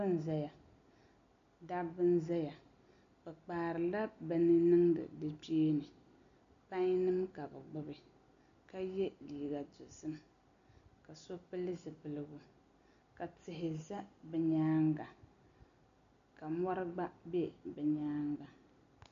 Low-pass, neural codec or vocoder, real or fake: 7.2 kHz; none; real